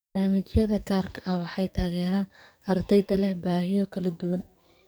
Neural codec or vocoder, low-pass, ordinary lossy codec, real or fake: codec, 44.1 kHz, 2.6 kbps, SNAC; none; none; fake